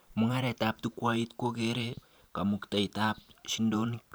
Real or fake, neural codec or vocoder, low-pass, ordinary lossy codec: fake; vocoder, 44.1 kHz, 128 mel bands every 512 samples, BigVGAN v2; none; none